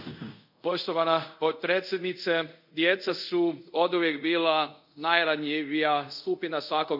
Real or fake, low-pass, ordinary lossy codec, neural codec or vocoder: fake; 5.4 kHz; none; codec, 24 kHz, 0.5 kbps, DualCodec